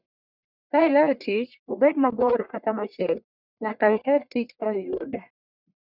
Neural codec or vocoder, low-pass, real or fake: codec, 44.1 kHz, 1.7 kbps, Pupu-Codec; 5.4 kHz; fake